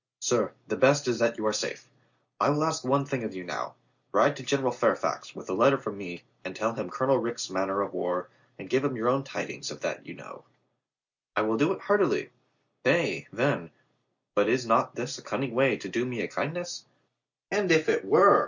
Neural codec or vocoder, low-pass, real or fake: none; 7.2 kHz; real